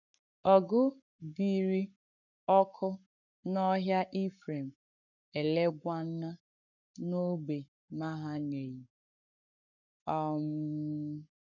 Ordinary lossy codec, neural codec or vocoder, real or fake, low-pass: none; codec, 44.1 kHz, 7.8 kbps, Pupu-Codec; fake; 7.2 kHz